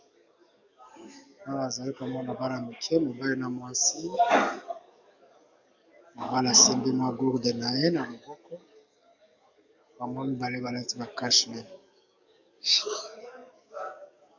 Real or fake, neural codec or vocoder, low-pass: fake; codec, 44.1 kHz, 7.8 kbps, DAC; 7.2 kHz